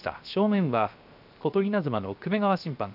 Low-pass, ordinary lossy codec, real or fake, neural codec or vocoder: 5.4 kHz; none; fake; codec, 16 kHz, 0.7 kbps, FocalCodec